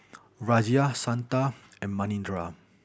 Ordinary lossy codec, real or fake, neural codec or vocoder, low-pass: none; real; none; none